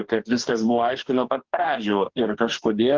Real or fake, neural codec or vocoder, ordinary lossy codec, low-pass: fake; codec, 44.1 kHz, 2.6 kbps, DAC; Opus, 16 kbps; 7.2 kHz